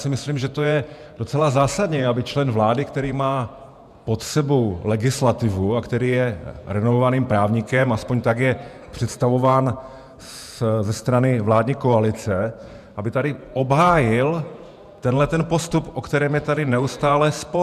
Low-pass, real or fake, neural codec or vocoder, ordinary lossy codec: 14.4 kHz; fake; vocoder, 48 kHz, 128 mel bands, Vocos; MP3, 96 kbps